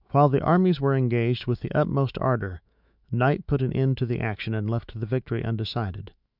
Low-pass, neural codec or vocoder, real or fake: 5.4 kHz; autoencoder, 48 kHz, 128 numbers a frame, DAC-VAE, trained on Japanese speech; fake